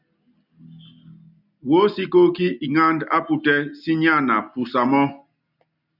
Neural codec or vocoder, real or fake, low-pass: none; real; 5.4 kHz